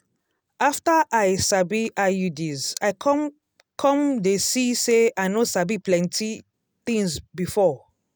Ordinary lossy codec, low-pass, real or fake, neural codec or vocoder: none; none; real; none